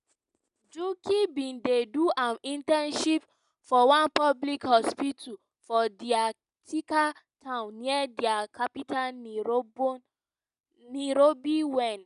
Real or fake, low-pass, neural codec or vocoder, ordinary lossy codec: real; 10.8 kHz; none; none